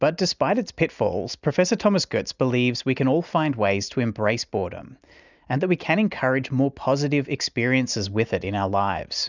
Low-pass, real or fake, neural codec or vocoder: 7.2 kHz; real; none